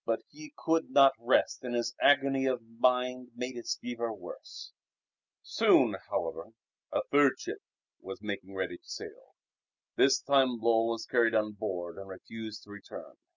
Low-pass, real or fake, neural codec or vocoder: 7.2 kHz; real; none